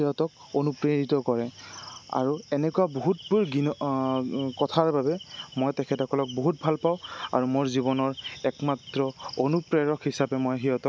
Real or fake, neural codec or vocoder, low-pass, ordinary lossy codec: real; none; none; none